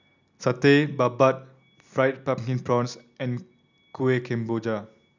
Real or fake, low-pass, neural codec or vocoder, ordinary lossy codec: real; 7.2 kHz; none; none